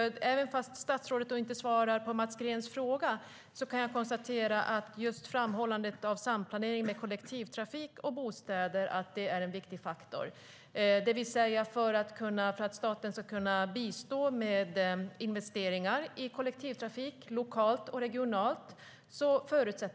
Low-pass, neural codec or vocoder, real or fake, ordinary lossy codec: none; none; real; none